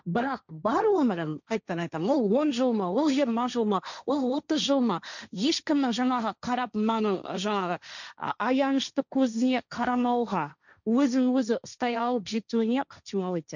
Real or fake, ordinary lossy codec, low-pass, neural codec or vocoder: fake; none; 7.2 kHz; codec, 16 kHz, 1.1 kbps, Voila-Tokenizer